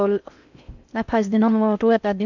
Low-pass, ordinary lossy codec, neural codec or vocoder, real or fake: 7.2 kHz; none; codec, 16 kHz in and 24 kHz out, 0.6 kbps, FocalCodec, streaming, 4096 codes; fake